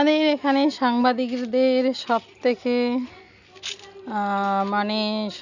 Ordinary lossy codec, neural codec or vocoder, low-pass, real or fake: none; none; 7.2 kHz; real